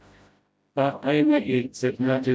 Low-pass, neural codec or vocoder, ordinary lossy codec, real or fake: none; codec, 16 kHz, 0.5 kbps, FreqCodec, smaller model; none; fake